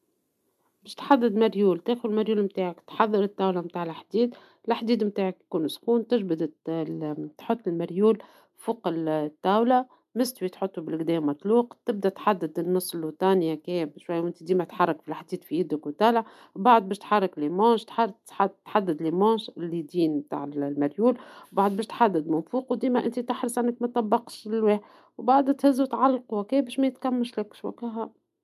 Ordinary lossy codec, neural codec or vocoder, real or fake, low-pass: none; none; real; 14.4 kHz